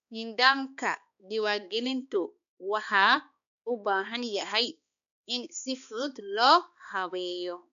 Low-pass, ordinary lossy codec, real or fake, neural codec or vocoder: 7.2 kHz; none; fake; codec, 16 kHz, 2 kbps, X-Codec, HuBERT features, trained on balanced general audio